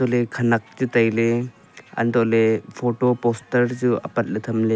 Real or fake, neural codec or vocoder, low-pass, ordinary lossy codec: real; none; none; none